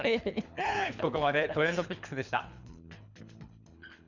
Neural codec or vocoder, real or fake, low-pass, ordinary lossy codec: codec, 24 kHz, 3 kbps, HILCodec; fake; 7.2 kHz; none